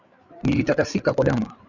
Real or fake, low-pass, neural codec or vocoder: fake; 7.2 kHz; codec, 16 kHz, 8 kbps, FreqCodec, larger model